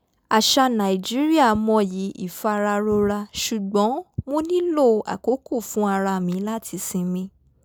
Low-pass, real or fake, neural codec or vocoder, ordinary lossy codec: none; real; none; none